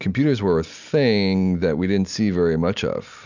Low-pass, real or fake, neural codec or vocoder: 7.2 kHz; real; none